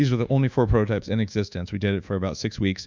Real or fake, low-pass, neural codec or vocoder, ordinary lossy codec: fake; 7.2 kHz; codec, 24 kHz, 1.2 kbps, DualCodec; MP3, 64 kbps